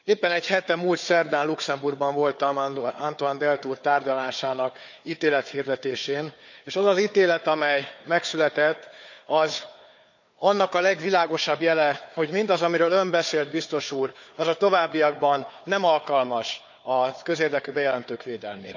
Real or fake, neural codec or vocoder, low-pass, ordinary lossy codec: fake; codec, 16 kHz, 4 kbps, FunCodec, trained on Chinese and English, 50 frames a second; 7.2 kHz; none